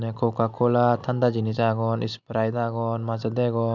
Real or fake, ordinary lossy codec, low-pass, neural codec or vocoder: real; none; 7.2 kHz; none